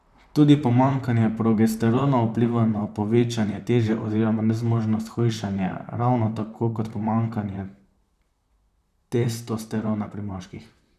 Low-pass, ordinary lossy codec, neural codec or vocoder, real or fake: 14.4 kHz; none; vocoder, 44.1 kHz, 128 mel bands, Pupu-Vocoder; fake